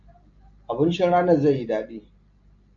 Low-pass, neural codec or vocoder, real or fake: 7.2 kHz; none; real